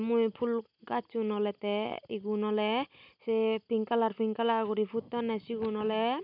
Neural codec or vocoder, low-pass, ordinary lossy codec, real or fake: none; 5.4 kHz; none; real